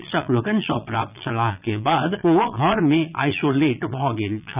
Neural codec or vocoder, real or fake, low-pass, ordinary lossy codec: vocoder, 22.05 kHz, 80 mel bands, Vocos; fake; 3.6 kHz; none